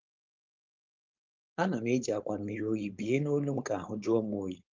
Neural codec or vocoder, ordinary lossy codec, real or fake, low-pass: codec, 16 kHz, 4.8 kbps, FACodec; Opus, 24 kbps; fake; 7.2 kHz